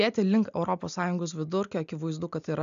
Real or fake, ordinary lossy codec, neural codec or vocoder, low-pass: real; AAC, 96 kbps; none; 7.2 kHz